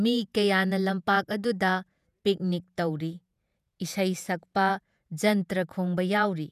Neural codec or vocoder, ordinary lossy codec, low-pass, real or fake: vocoder, 48 kHz, 128 mel bands, Vocos; none; 14.4 kHz; fake